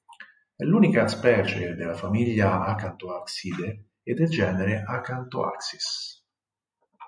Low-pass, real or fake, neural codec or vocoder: 9.9 kHz; real; none